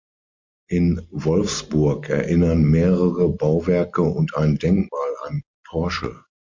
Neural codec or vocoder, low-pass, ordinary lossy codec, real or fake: none; 7.2 kHz; MP3, 48 kbps; real